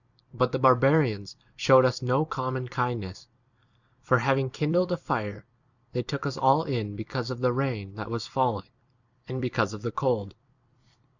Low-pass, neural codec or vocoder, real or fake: 7.2 kHz; vocoder, 44.1 kHz, 128 mel bands every 256 samples, BigVGAN v2; fake